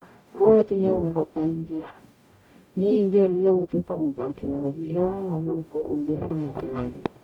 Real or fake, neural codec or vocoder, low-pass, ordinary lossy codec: fake; codec, 44.1 kHz, 0.9 kbps, DAC; 19.8 kHz; MP3, 96 kbps